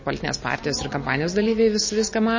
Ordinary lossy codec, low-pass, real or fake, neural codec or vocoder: MP3, 32 kbps; 7.2 kHz; real; none